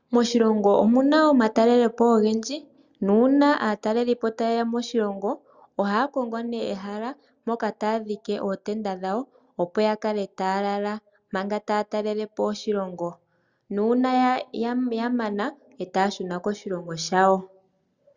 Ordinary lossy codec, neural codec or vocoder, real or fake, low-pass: Opus, 64 kbps; none; real; 7.2 kHz